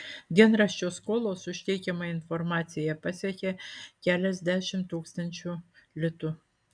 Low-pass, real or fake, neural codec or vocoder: 9.9 kHz; real; none